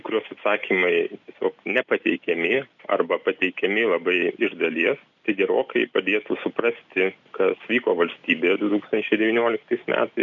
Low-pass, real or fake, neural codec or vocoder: 7.2 kHz; real; none